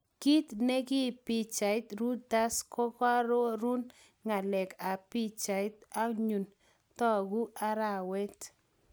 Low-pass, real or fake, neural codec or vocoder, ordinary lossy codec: none; real; none; none